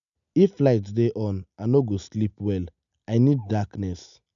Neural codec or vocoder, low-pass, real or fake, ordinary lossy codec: none; 7.2 kHz; real; none